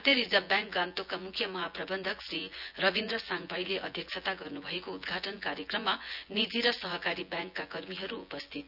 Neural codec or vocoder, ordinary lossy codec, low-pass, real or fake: vocoder, 24 kHz, 100 mel bands, Vocos; none; 5.4 kHz; fake